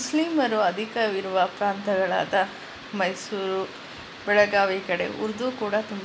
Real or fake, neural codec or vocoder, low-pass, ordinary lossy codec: real; none; none; none